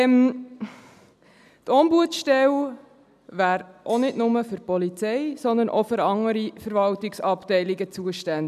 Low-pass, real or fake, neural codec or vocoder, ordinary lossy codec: 14.4 kHz; real; none; none